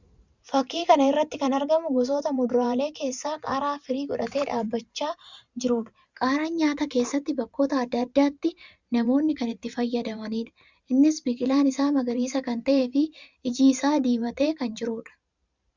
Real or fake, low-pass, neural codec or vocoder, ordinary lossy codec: fake; 7.2 kHz; vocoder, 44.1 kHz, 128 mel bands every 512 samples, BigVGAN v2; Opus, 64 kbps